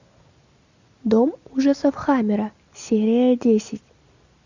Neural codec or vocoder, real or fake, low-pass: none; real; 7.2 kHz